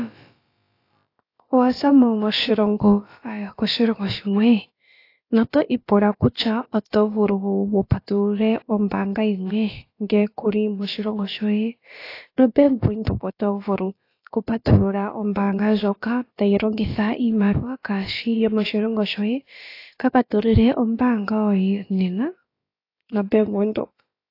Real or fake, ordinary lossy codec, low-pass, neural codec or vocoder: fake; AAC, 32 kbps; 5.4 kHz; codec, 16 kHz, about 1 kbps, DyCAST, with the encoder's durations